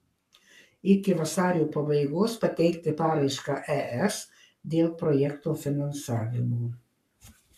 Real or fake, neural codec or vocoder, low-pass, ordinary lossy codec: fake; codec, 44.1 kHz, 7.8 kbps, Pupu-Codec; 14.4 kHz; MP3, 96 kbps